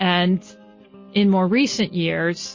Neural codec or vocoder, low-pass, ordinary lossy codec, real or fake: none; 7.2 kHz; MP3, 32 kbps; real